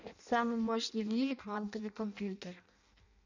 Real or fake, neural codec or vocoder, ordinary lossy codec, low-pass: fake; codec, 16 kHz in and 24 kHz out, 0.6 kbps, FireRedTTS-2 codec; none; 7.2 kHz